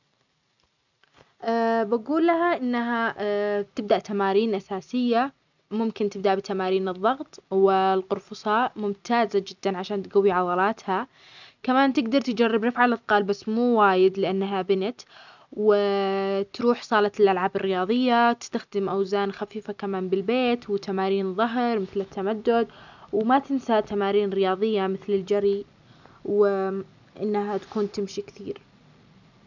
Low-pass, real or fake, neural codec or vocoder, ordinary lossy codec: 7.2 kHz; real; none; none